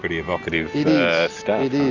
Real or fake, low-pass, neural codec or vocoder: real; 7.2 kHz; none